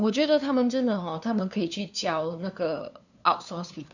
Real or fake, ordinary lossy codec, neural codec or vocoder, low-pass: fake; none; codec, 16 kHz, 2 kbps, FunCodec, trained on LibriTTS, 25 frames a second; 7.2 kHz